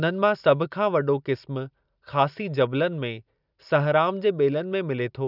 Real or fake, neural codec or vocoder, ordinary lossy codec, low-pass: real; none; none; 5.4 kHz